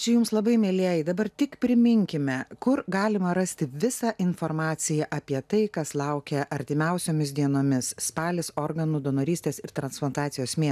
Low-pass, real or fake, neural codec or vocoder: 14.4 kHz; real; none